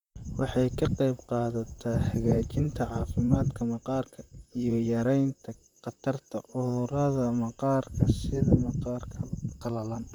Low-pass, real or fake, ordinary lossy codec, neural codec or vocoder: 19.8 kHz; fake; none; vocoder, 44.1 kHz, 128 mel bands, Pupu-Vocoder